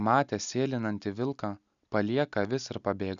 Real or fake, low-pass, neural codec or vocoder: real; 7.2 kHz; none